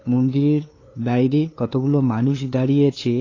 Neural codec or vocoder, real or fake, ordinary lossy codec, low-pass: codec, 16 kHz, 2 kbps, FunCodec, trained on LibriTTS, 25 frames a second; fake; AAC, 32 kbps; 7.2 kHz